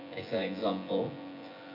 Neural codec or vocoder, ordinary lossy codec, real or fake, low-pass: vocoder, 24 kHz, 100 mel bands, Vocos; none; fake; 5.4 kHz